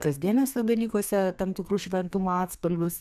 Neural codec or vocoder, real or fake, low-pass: codec, 32 kHz, 1.9 kbps, SNAC; fake; 14.4 kHz